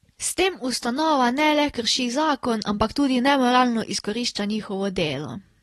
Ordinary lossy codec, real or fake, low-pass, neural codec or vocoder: AAC, 32 kbps; real; 19.8 kHz; none